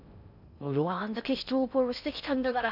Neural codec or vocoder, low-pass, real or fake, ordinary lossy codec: codec, 16 kHz in and 24 kHz out, 0.6 kbps, FocalCodec, streaming, 2048 codes; 5.4 kHz; fake; none